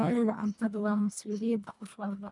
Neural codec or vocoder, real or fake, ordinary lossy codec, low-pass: codec, 24 kHz, 1.5 kbps, HILCodec; fake; MP3, 96 kbps; 10.8 kHz